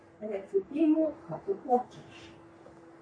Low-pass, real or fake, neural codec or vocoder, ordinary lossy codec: 9.9 kHz; fake; codec, 44.1 kHz, 3.4 kbps, Pupu-Codec; MP3, 48 kbps